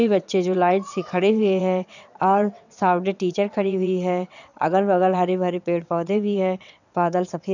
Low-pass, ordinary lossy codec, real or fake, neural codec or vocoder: 7.2 kHz; none; fake; vocoder, 22.05 kHz, 80 mel bands, WaveNeXt